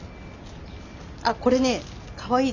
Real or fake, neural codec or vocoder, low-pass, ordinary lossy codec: real; none; 7.2 kHz; none